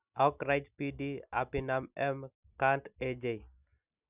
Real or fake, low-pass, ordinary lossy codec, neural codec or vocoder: real; 3.6 kHz; none; none